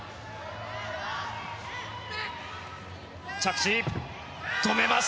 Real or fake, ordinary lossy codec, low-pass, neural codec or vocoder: real; none; none; none